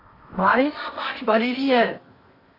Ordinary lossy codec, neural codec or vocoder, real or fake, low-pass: AAC, 24 kbps; codec, 16 kHz in and 24 kHz out, 0.8 kbps, FocalCodec, streaming, 65536 codes; fake; 5.4 kHz